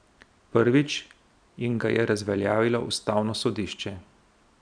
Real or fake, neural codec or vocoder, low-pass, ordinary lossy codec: real; none; 9.9 kHz; none